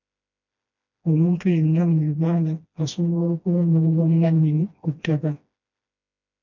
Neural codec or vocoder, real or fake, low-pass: codec, 16 kHz, 1 kbps, FreqCodec, smaller model; fake; 7.2 kHz